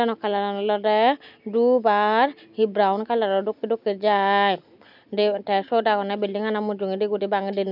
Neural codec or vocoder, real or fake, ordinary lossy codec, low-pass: none; real; none; 5.4 kHz